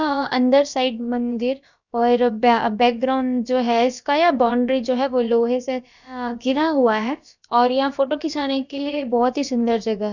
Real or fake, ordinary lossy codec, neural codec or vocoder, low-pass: fake; none; codec, 16 kHz, about 1 kbps, DyCAST, with the encoder's durations; 7.2 kHz